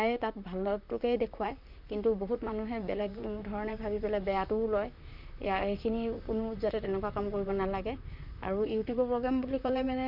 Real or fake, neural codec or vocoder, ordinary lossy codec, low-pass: fake; vocoder, 44.1 kHz, 128 mel bands, Pupu-Vocoder; none; 5.4 kHz